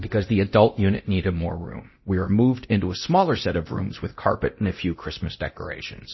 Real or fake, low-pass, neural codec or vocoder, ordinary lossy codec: fake; 7.2 kHz; codec, 24 kHz, 0.9 kbps, DualCodec; MP3, 24 kbps